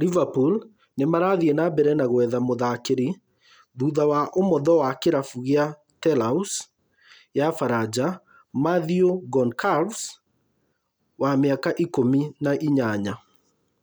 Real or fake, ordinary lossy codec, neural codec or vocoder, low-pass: real; none; none; none